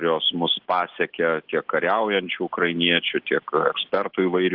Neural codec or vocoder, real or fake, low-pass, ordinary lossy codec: none; real; 5.4 kHz; Opus, 16 kbps